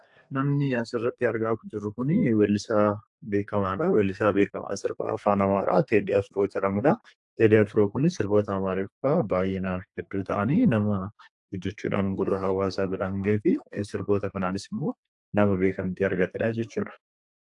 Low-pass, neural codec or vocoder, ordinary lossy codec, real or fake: 10.8 kHz; codec, 32 kHz, 1.9 kbps, SNAC; AAC, 64 kbps; fake